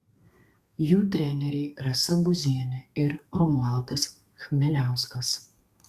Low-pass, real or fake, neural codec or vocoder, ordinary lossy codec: 14.4 kHz; fake; codec, 32 kHz, 1.9 kbps, SNAC; Opus, 64 kbps